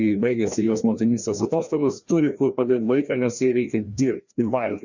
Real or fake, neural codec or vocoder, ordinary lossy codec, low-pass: fake; codec, 16 kHz, 1 kbps, FreqCodec, larger model; Opus, 64 kbps; 7.2 kHz